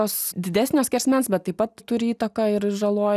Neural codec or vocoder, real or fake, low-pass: none; real; 14.4 kHz